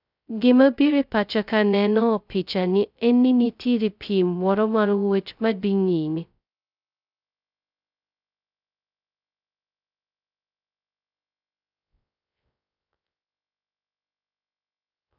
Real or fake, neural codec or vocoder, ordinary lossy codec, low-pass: fake; codec, 16 kHz, 0.2 kbps, FocalCodec; none; 5.4 kHz